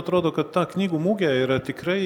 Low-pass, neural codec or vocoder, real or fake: 19.8 kHz; none; real